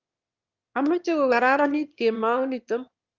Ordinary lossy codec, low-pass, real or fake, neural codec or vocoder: Opus, 32 kbps; 7.2 kHz; fake; autoencoder, 22.05 kHz, a latent of 192 numbers a frame, VITS, trained on one speaker